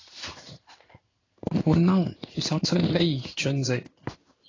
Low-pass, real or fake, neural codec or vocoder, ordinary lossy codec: 7.2 kHz; fake; codec, 16 kHz in and 24 kHz out, 1 kbps, XY-Tokenizer; AAC, 32 kbps